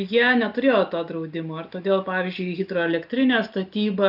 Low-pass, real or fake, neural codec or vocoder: 5.4 kHz; real; none